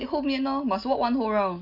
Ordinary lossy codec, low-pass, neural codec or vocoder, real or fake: none; 5.4 kHz; none; real